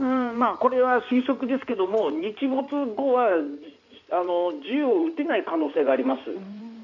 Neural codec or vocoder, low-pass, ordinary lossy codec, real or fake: codec, 16 kHz in and 24 kHz out, 2.2 kbps, FireRedTTS-2 codec; 7.2 kHz; none; fake